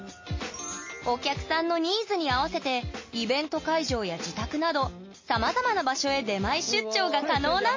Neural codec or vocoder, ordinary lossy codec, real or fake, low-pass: none; MP3, 32 kbps; real; 7.2 kHz